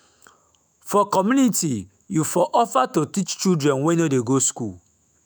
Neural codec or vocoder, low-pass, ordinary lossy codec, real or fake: autoencoder, 48 kHz, 128 numbers a frame, DAC-VAE, trained on Japanese speech; none; none; fake